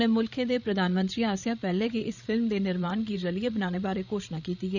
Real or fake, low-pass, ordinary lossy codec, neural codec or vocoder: fake; 7.2 kHz; none; codec, 16 kHz, 16 kbps, FreqCodec, larger model